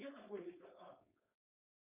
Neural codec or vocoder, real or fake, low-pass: codec, 16 kHz, 4.8 kbps, FACodec; fake; 3.6 kHz